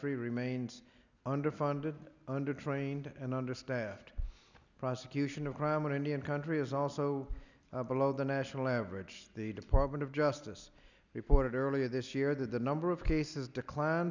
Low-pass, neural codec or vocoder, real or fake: 7.2 kHz; none; real